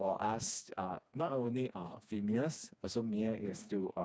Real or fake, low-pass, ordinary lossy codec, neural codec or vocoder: fake; none; none; codec, 16 kHz, 2 kbps, FreqCodec, smaller model